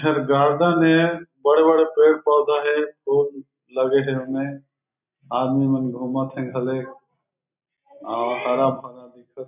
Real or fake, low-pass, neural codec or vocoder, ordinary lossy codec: real; 3.6 kHz; none; none